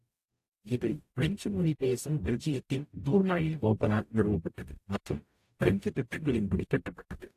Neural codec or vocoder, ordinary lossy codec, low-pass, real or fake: codec, 44.1 kHz, 0.9 kbps, DAC; AAC, 64 kbps; 14.4 kHz; fake